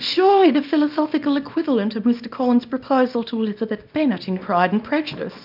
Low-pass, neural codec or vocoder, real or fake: 5.4 kHz; codec, 24 kHz, 0.9 kbps, WavTokenizer, small release; fake